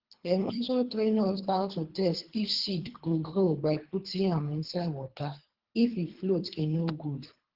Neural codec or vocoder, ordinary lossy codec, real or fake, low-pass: codec, 24 kHz, 3 kbps, HILCodec; Opus, 16 kbps; fake; 5.4 kHz